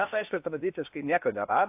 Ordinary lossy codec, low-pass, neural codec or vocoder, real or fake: AAC, 32 kbps; 3.6 kHz; codec, 16 kHz in and 24 kHz out, 0.8 kbps, FocalCodec, streaming, 65536 codes; fake